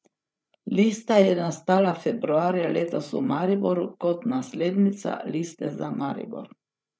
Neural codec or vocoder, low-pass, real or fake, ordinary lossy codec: codec, 16 kHz, 8 kbps, FreqCodec, larger model; none; fake; none